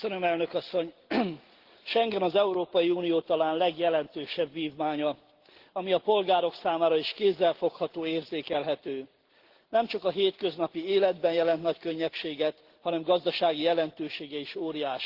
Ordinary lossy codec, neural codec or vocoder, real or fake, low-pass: Opus, 16 kbps; none; real; 5.4 kHz